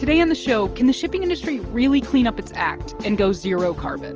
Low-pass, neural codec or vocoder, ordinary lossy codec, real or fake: 7.2 kHz; none; Opus, 24 kbps; real